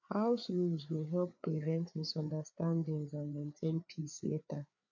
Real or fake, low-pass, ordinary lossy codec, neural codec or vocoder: fake; 7.2 kHz; MP3, 48 kbps; codec, 16 kHz, 4 kbps, FreqCodec, larger model